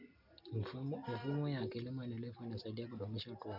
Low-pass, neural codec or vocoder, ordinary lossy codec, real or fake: 5.4 kHz; none; none; real